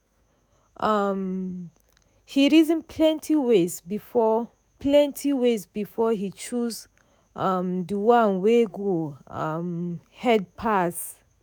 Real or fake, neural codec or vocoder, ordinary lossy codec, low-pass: fake; autoencoder, 48 kHz, 128 numbers a frame, DAC-VAE, trained on Japanese speech; none; none